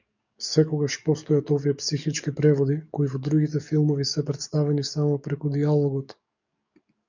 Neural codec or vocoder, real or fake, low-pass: codec, 44.1 kHz, 7.8 kbps, DAC; fake; 7.2 kHz